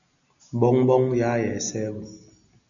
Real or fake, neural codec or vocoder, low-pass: real; none; 7.2 kHz